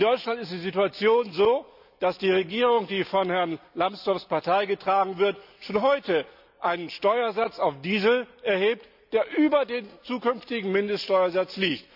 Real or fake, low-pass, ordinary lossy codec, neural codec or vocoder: real; 5.4 kHz; none; none